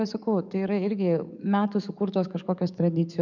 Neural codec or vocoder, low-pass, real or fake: codec, 44.1 kHz, 7.8 kbps, DAC; 7.2 kHz; fake